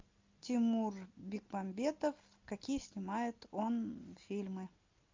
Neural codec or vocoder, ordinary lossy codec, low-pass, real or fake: none; MP3, 64 kbps; 7.2 kHz; real